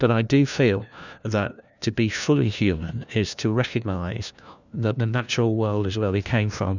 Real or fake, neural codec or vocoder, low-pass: fake; codec, 16 kHz, 1 kbps, FunCodec, trained on LibriTTS, 50 frames a second; 7.2 kHz